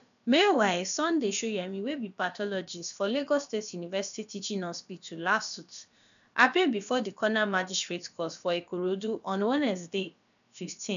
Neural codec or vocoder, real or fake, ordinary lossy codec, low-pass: codec, 16 kHz, about 1 kbps, DyCAST, with the encoder's durations; fake; none; 7.2 kHz